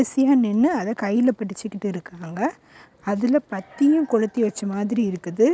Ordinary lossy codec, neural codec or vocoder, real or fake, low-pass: none; none; real; none